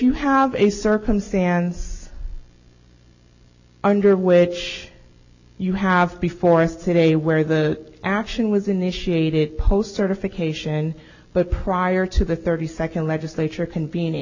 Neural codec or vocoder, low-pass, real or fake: none; 7.2 kHz; real